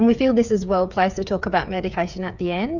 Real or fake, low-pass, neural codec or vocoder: fake; 7.2 kHz; codec, 16 kHz, 8 kbps, FreqCodec, smaller model